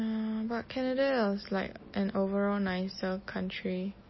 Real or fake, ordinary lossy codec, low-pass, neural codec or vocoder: real; MP3, 24 kbps; 7.2 kHz; none